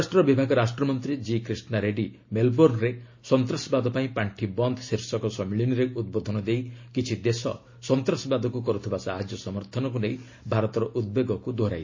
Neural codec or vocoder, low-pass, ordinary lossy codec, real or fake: none; 7.2 kHz; MP3, 32 kbps; real